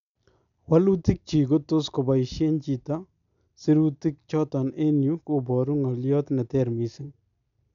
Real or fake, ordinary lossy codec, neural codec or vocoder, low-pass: real; none; none; 7.2 kHz